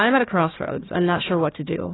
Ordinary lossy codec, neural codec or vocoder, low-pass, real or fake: AAC, 16 kbps; codec, 16 kHz, 1 kbps, FunCodec, trained on Chinese and English, 50 frames a second; 7.2 kHz; fake